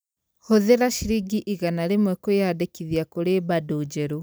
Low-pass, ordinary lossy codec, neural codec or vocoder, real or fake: none; none; vocoder, 44.1 kHz, 128 mel bands every 256 samples, BigVGAN v2; fake